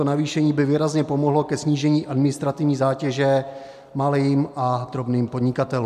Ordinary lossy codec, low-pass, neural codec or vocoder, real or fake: MP3, 96 kbps; 14.4 kHz; none; real